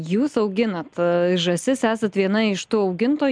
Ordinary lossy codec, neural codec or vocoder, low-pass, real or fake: MP3, 96 kbps; none; 9.9 kHz; real